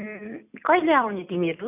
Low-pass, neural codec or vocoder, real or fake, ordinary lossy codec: 3.6 kHz; none; real; none